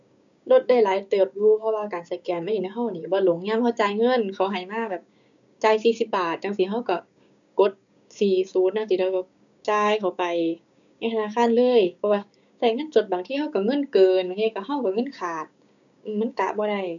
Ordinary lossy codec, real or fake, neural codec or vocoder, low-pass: none; fake; codec, 16 kHz, 6 kbps, DAC; 7.2 kHz